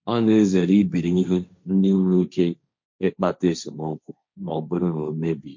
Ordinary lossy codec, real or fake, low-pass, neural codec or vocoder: MP3, 48 kbps; fake; 7.2 kHz; codec, 16 kHz, 1.1 kbps, Voila-Tokenizer